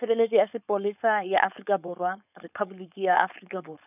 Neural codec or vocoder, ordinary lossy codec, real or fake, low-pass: codec, 16 kHz, 4.8 kbps, FACodec; none; fake; 3.6 kHz